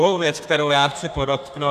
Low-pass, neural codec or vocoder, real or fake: 14.4 kHz; codec, 32 kHz, 1.9 kbps, SNAC; fake